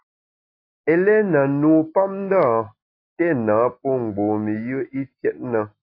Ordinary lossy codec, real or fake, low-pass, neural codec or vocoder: AAC, 32 kbps; real; 5.4 kHz; none